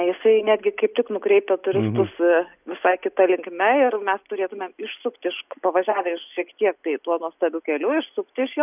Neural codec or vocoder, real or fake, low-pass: none; real; 3.6 kHz